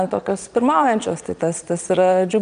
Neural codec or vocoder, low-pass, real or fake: vocoder, 22.05 kHz, 80 mel bands, Vocos; 9.9 kHz; fake